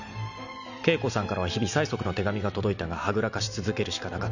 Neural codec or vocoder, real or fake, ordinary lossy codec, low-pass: none; real; none; 7.2 kHz